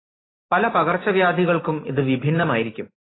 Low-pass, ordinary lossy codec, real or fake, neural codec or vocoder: 7.2 kHz; AAC, 16 kbps; real; none